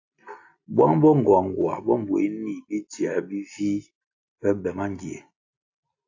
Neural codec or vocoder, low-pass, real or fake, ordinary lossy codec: none; 7.2 kHz; real; AAC, 48 kbps